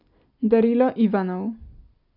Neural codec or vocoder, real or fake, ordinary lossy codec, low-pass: none; real; none; 5.4 kHz